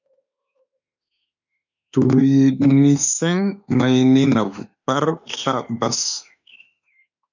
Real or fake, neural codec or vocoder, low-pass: fake; autoencoder, 48 kHz, 32 numbers a frame, DAC-VAE, trained on Japanese speech; 7.2 kHz